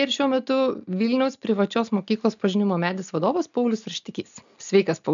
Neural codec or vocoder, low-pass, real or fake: none; 7.2 kHz; real